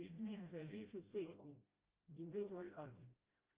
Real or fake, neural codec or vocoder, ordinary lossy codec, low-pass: fake; codec, 16 kHz, 0.5 kbps, FreqCodec, smaller model; Opus, 32 kbps; 3.6 kHz